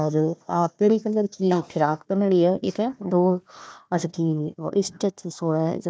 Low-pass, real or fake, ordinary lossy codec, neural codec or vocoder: none; fake; none; codec, 16 kHz, 1 kbps, FunCodec, trained on Chinese and English, 50 frames a second